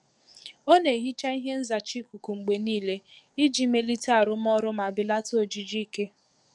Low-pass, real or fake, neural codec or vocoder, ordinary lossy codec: 10.8 kHz; fake; codec, 44.1 kHz, 7.8 kbps, DAC; none